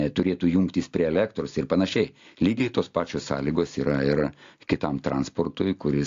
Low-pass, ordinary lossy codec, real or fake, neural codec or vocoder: 7.2 kHz; AAC, 48 kbps; real; none